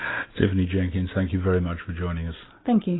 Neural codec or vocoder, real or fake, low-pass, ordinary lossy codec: none; real; 7.2 kHz; AAC, 16 kbps